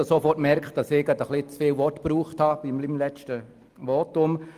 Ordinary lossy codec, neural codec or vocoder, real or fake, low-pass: Opus, 32 kbps; none; real; 14.4 kHz